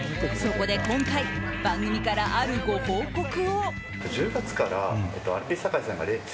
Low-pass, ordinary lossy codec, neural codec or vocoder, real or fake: none; none; none; real